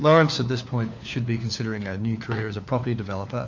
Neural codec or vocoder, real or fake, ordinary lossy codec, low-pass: codec, 16 kHz, 4 kbps, FunCodec, trained on LibriTTS, 50 frames a second; fake; AAC, 48 kbps; 7.2 kHz